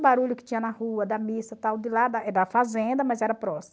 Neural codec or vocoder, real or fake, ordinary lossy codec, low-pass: none; real; none; none